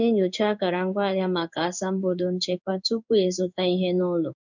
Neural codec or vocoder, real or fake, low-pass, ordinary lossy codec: codec, 16 kHz in and 24 kHz out, 1 kbps, XY-Tokenizer; fake; 7.2 kHz; none